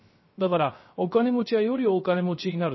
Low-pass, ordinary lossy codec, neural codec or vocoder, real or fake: 7.2 kHz; MP3, 24 kbps; codec, 16 kHz, 0.3 kbps, FocalCodec; fake